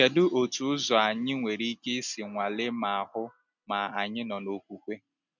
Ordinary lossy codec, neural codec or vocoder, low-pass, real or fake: none; none; 7.2 kHz; real